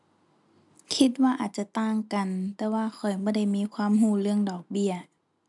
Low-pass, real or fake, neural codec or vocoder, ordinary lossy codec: 10.8 kHz; real; none; none